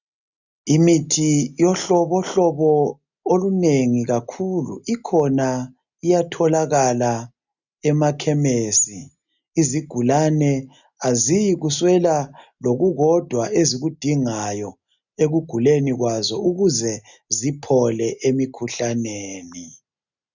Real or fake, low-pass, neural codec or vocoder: real; 7.2 kHz; none